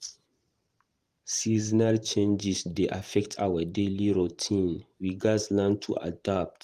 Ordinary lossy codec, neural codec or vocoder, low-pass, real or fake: Opus, 24 kbps; vocoder, 44.1 kHz, 128 mel bands every 512 samples, BigVGAN v2; 14.4 kHz; fake